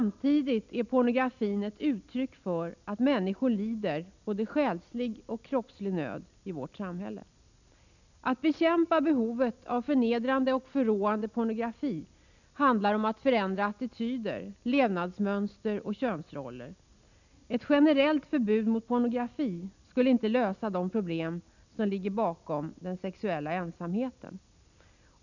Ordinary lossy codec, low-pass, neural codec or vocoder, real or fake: none; 7.2 kHz; none; real